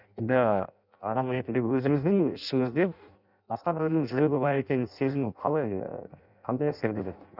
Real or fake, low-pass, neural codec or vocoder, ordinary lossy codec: fake; 5.4 kHz; codec, 16 kHz in and 24 kHz out, 0.6 kbps, FireRedTTS-2 codec; none